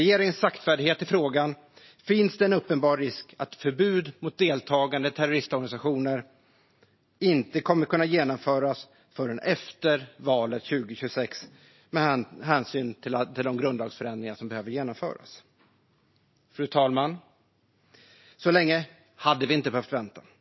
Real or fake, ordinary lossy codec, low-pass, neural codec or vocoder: real; MP3, 24 kbps; 7.2 kHz; none